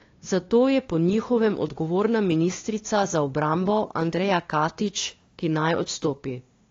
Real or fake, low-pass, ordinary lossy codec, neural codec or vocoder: fake; 7.2 kHz; AAC, 32 kbps; codec, 16 kHz, 2 kbps, FunCodec, trained on LibriTTS, 25 frames a second